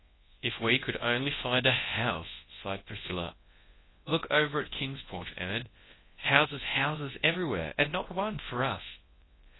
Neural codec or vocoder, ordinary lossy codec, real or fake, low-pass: codec, 24 kHz, 0.9 kbps, WavTokenizer, large speech release; AAC, 16 kbps; fake; 7.2 kHz